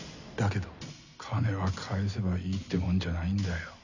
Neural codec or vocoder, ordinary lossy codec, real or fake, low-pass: none; none; real; 7.2 kHz